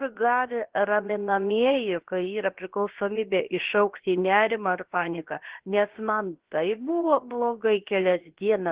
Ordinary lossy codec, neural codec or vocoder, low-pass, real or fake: Opus, 16 kbps; codec, 16 kHz, about 1 kbps, DyCAST, with the encoder's durations; 3.6 kHz; fake